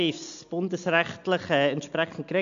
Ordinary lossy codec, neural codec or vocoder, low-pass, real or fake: MP3, 64 kbps; none; 7.2 kHz; real